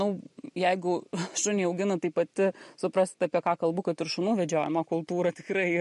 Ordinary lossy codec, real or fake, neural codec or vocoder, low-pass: MP3, 48 kbps; real; none; 14.4 kHz